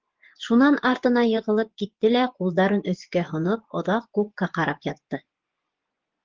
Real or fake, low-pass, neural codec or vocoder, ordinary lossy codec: fake; 7.2 kHz; vocoder, 24 kHz, 100 mel bands, Vocos; Opus, 16 kbps